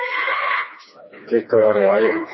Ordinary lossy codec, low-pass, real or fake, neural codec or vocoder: MP3, 24 kbps; 7.2 kHz; fake; codec, 16 kHz, 2 kbps, FreqCodec, smaller model